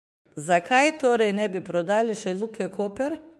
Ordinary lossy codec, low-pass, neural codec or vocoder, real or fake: MP3, 64 kbps; 14.4 kHz; autoencoder, 48 kHz, 32 numbers a frame, DAC-VAE, trained on Japanese speech; fake